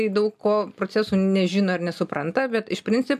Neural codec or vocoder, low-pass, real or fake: none; 14.4 kHz; real